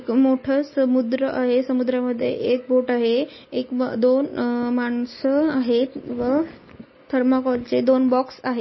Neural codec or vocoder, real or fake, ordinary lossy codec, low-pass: none; real; MP3, 24 kbps; 7.2 kHz